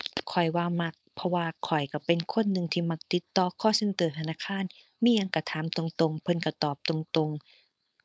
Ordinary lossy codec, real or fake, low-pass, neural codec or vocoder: none; fake; none; codec, 16 kHz, 4.8 kbps, FACodec